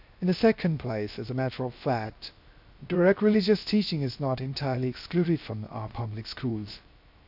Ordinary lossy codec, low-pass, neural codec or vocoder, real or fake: AAC, 48 kbps; 5.4 kHz; codec, 16 kHz, 0.3 kbps, FocalCodec; fake